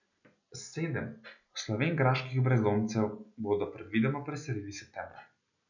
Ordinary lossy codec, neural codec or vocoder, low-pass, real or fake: none; none; 7.2 kHz; real